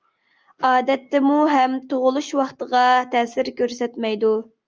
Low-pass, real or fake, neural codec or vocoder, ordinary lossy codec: 7.2 kHz; real; none; Opus, 24 kbps